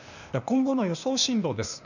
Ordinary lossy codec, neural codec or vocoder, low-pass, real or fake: none; codec, 16 kHz, 0.8 kbps, ZipCodec; 7.2 kHz; fake